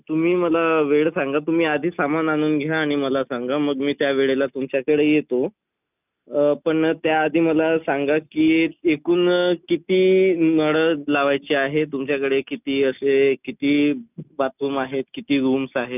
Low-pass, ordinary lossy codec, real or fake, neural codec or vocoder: 3.6 kHz; none; real; none